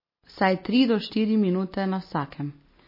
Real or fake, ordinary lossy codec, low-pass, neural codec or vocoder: real; MP3, 24 kbps; 5.4 kHz; none